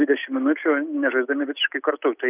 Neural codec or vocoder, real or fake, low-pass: none; real; 3.6 kHz